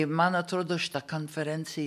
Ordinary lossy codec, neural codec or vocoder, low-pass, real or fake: AAC, 96 kbps; none; 14.4 kHz; real